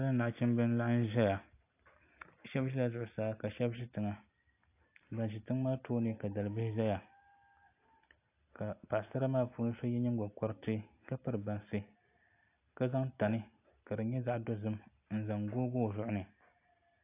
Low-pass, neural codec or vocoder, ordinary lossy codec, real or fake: 3.6 kHz; none; AAC, 24 kbps; real